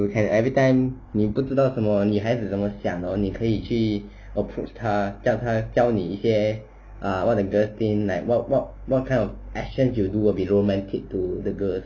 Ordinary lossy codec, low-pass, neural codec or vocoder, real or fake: none; 7.2 kHz; none; real